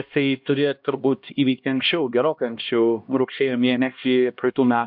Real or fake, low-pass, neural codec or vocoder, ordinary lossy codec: fake; 5.4 kHz; codec, 16 kHz, 1 kbps, X-Codec, HuBERT features, trained on LibriSpeech; MP3, 48 kbps